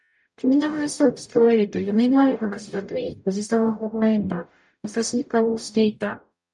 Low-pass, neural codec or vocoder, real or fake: 10.8 kHz; codec, 44.1 kHz, 0.9 kbps, DAC; fake